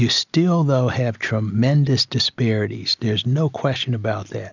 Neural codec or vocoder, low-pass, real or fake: none; 7.2 kHz; real